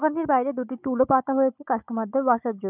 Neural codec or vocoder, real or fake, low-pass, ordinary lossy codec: codec, 16 kHz, 4 kbps, FunCodec, trained on Chinese and English, 50 frames a second; fake; 3.6 kHz; none